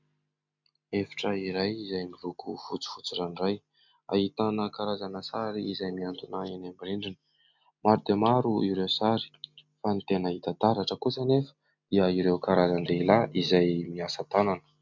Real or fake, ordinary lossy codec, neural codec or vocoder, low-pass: real; MP3, 64 kbps; none; 7.2 kHz